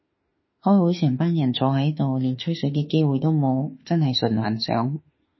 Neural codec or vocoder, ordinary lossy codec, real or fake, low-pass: autoencoder, 48 kHz, 32 numbers a frame, DAC-VAE, trained on Japanese speech; MP3, 24 kbps; fake; 7.2 kHz